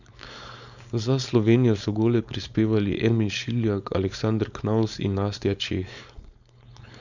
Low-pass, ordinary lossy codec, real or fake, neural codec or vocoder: 7.2 kHz; none; fake; codec, 16 kHz, 4.8 kbps, FACodec